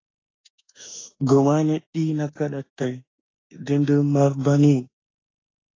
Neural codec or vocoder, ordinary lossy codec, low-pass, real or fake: autoencoder, 48 kHz, 32 numbers a frame, DAC-VAE, trained on Japanese speech; AAC, 32 kbps; 7.2 kHz; fake